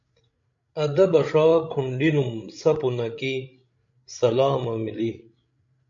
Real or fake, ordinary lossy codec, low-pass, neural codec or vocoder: fake; MP3, 48 kbps; 7.2 kHz; codec, 16 kHz, 16 kbps, FreqCodec, larger model